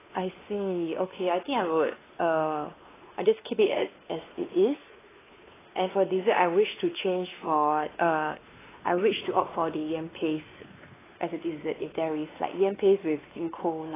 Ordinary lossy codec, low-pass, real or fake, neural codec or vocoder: AAC, 16 kbps; 3.6 kHz; fake; codec, 16 kHz, 2 kbps, X-Codec, WavLM features, trained on Multilingual LibriSpeech